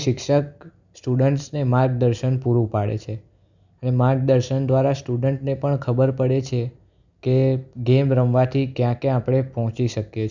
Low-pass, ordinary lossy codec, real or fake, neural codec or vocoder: 7.2 kHz; none; real; none